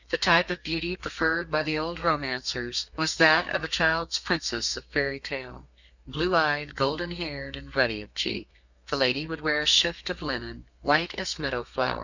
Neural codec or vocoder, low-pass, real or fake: codec, 32 kHz, 1.9 kbps, SNAC; 7.2 kHz; fake